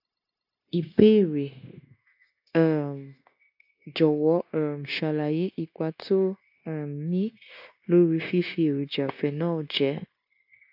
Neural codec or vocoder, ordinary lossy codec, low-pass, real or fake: codec, 16 kHz, 0.9 kbps, LongCat-Audio-Codec; AAC, 48 kbps; 5.4 kHz; fake